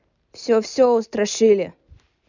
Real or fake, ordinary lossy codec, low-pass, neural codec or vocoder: real; none; 7.2 kHz; none